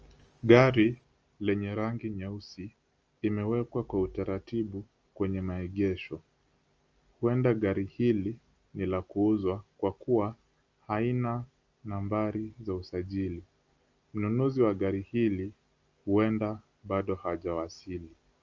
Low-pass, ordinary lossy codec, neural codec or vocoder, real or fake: 7.2 kHz; Opus, 24 kbps; none; real